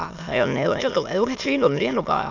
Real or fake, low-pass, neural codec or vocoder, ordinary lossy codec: fake; 7.2 kHz; autoencoder, 22.05 kHz, a latent of 192 numbers a frame, VITS, trained on many speakers; none